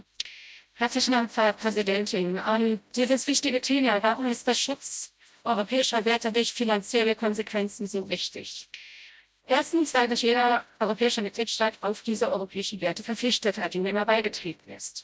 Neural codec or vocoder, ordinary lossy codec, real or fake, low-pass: codec, 16 kHz, 0.5 kbps, FreqCodec, smaller model; none; fake; none